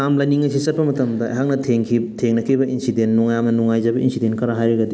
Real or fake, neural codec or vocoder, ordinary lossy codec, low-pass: real; none; none; none